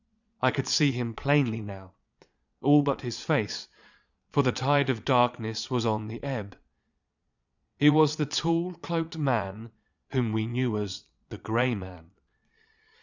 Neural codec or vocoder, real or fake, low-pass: vocoder, 22.05 kHz, 80 mel bands, Vocos; fake; 7.2 kHz